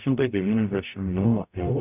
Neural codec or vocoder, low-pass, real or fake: codec, 44.1 kHz, 0.9 kbps, DAC; 3.6 kHz; fake